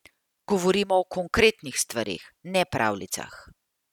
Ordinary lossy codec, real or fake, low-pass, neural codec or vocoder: none; real; 19.8 kHz; none